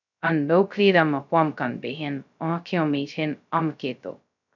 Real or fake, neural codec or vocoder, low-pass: fake; codec, 16 kHz, 0.2 kbps, FocalCodec; 7.2 kHz